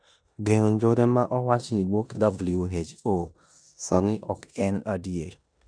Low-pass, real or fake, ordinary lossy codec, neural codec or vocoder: 9.9 kHz; fake; none; codec, 16 kHz in and 24 kHz out, 0.9 kbps, LongCat-Audio-Codec, four codebook decoder